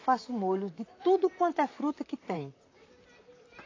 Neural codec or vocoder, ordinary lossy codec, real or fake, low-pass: vocoder, 44.1 kHz, 128 mel bands, Pupu-Vocoder; AAC, 32 kbps; fake; 7.2 kHz